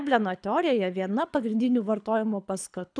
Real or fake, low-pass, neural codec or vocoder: fake; 9.9 kHz; codec, 24 kHz, 6 kbps, HILCodec